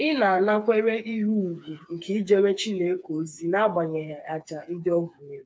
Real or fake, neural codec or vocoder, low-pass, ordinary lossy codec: fake; codec, 16 kHz, 4 kbps, FreqCodec, smaller model; none; none